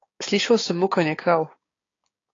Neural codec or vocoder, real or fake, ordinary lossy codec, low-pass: codec, 16 kHz, 6 kbps, DAC; fake; AAC, 32 kbps; 7.2 kHz